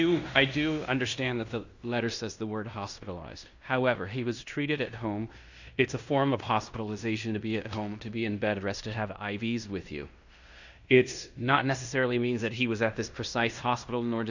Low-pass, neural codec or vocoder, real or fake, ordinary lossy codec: 7.2 kHz; codec, 16 kHz in and 24 kHz out, 0.9 kbps, LongCat-Audio-Codec, fine tuned four codebook decoder; fake; Opus, 64 kbps